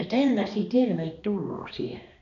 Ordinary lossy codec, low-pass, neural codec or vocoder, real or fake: none; 7.2 kHz; codec, 16 kHz, 2 kbps, X-Codec, HuBERT features, trained on balanced general audio; fake